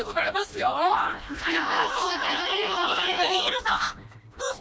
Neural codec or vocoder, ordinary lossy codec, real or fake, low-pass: codec, 16 kHz, 1 kbps, FreqCodec, smaller model; none; fake; none